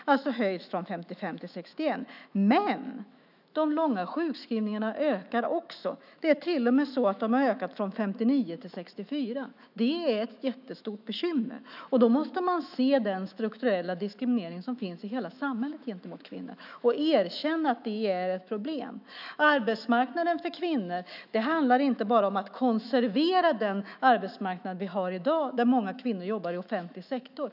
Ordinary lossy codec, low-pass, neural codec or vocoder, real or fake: none; 5.4 kHz; autoencoder, 48 kHz, 128 numbers a frame, DAC-VAE, trained on Japanese speech; fake